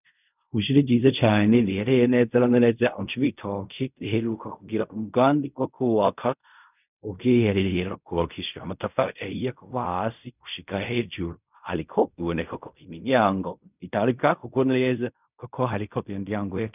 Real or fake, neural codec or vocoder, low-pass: fake; codec, 16 kHz in and 24 kHz out, 0.4 kbps, LongCat-Audio-Codec, fine tuned four codebook decoder; 3.6 kHz